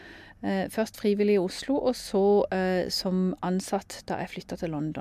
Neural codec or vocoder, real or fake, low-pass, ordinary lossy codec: none; real; 14.4 kHz; MP3, 96 kbps